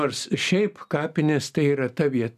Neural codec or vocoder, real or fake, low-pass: none; real; 14.4 kHz